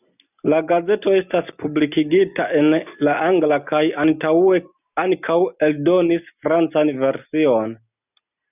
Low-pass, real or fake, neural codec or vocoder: 3.6 kHz; real; none